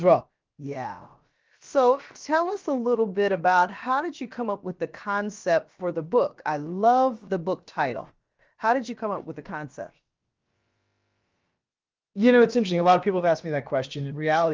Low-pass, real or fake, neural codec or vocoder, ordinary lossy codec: 7.2 kHz; fake; codec, 16 kHz, about 1 kbps, DyCAST, with the encoder's durations; Opus, 32 kbps